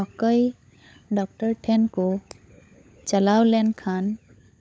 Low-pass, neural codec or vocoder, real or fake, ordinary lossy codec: none; codec, 16 kHz, 16 kbps, FunCodec, trained on LibriTTS, 50 frames a second; fake; none